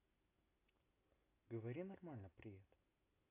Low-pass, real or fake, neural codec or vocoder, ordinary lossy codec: 3.6 kHz; real; none; AAC, 24 kbps